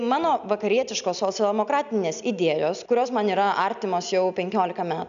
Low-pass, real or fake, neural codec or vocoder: 7.2 kHz; real; none